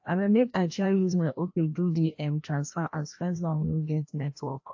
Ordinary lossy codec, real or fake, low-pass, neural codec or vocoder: AAC, 48 kbps; fake; 7.2 kHz; codec, 16 kHz, 1 kbps, FreqCodec, larger model